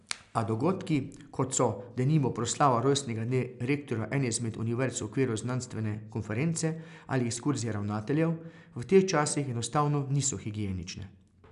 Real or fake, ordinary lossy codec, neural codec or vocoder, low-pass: real; none; none; 10.8 kHz